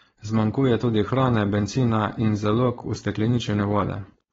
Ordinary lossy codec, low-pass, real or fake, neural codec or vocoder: AAC, 24 kbps; 7.2 kHz; fake; codec, 16 kHz, 4.8 kbps, FACodec